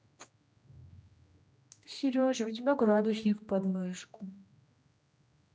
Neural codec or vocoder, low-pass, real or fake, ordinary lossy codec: codec, 16 kHz, 1 kbps, X-Codec, HuBERT features, trained on general audio; none; fake; none